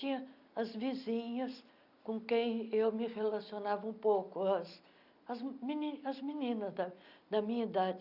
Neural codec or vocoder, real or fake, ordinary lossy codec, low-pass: none; real; none; 5.4 kHz